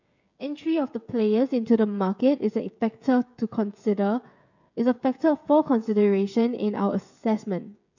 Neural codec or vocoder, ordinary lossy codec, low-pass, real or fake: codec, 16 kHz, 16 kbps, FreqCodec, smaller model; none; 7.2 kHz; fake